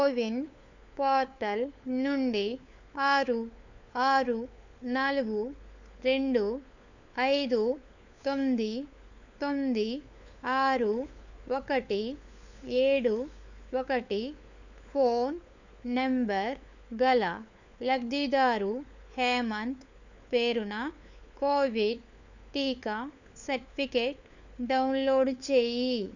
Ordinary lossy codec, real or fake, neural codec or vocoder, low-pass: none; fake; codec, 16 kHz, 4 kbps, FunCodec, trained on LibriTTS, 50 frames a second; 7.2 kHz